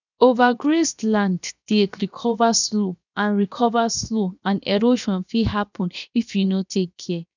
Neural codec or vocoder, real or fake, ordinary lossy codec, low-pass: codec, 16 kHz, 0.7 kbps, FocalCodec; fake; none; 7.2 kHz